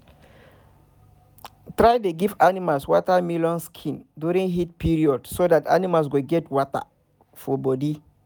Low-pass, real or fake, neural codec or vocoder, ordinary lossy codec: none; real; none; none